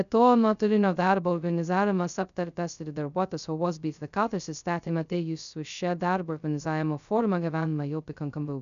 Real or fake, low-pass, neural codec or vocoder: fake; 7.2 kHz; codec, 16 kHz, 0.2 kbps, FocalCodec